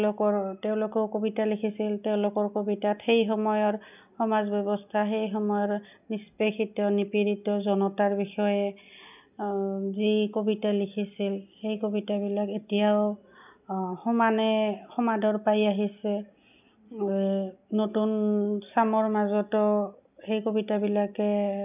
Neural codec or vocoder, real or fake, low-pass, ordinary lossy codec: none; real; 3.6 kHz; none